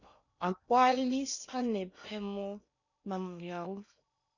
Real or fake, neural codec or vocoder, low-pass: fake; codec, 16 kHz in and 24 kHz out, 0.8 kbps, FocalCodec, streaming, 65536 codes; 7.2 kHz